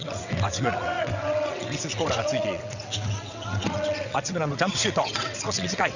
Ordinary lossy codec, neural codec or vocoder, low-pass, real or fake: none; vocoder, 22.05 kHz, 80 mel bands, WaveNeXt; 7.2 kHz; fake